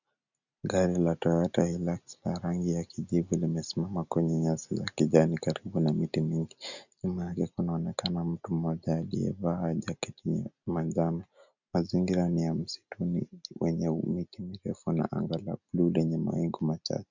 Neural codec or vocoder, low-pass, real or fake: none; 7.2 kHz; real